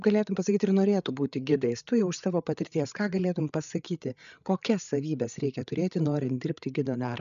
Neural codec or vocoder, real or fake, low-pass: codec, 16 kHz, 8 kbps, FreqCodec, larger model; fake; 7.2 kHz